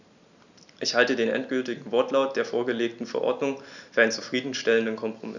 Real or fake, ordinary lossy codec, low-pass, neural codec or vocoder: real; none; 7.2 kHz; none